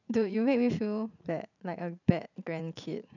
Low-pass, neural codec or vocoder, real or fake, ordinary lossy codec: 7.2 kHz; vocoder, 44.1 kHz, 80 mel bands, Vocos; fake; none